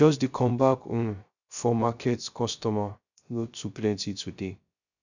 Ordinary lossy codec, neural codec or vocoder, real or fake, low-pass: none; codec, 16 kHz, 0.3 kbps, FocalCodec; fake; 7.2 kHz